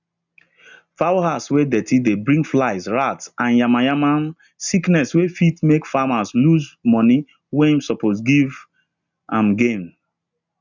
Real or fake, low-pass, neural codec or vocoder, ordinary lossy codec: real; 7.2 kHz; none; none